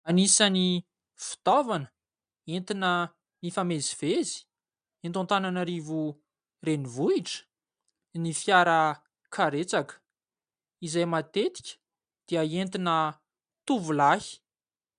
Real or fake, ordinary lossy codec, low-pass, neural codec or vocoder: real; MP3, 96 kbps; 9.9 kHz; none